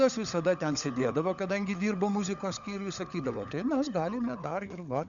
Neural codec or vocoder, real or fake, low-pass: codec, 16 kHz, 16 kbps, FunCodec, trained on LibriTTS, 50 frames a second; fake; 7.2 kHz